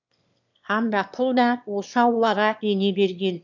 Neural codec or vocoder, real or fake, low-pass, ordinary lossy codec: autoencoder, 22.05 kHz, a latent of 192 numbers a frame, VITS, trained on one speaker; fake; 7.2 kHz; none